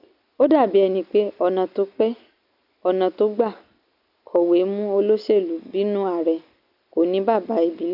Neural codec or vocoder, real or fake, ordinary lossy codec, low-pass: none; real; none; 5.4 kHz